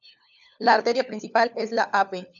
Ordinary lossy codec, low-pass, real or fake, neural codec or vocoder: MP3, 96 kbps; 7.2 kHz; fake; codec, 16 kHz, 4 kbps, FunCodec, trained on LibriTTS, 50 frames a second